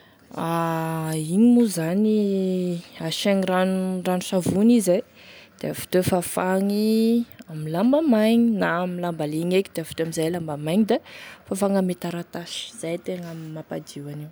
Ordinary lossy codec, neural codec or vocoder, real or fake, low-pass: none; none; real; none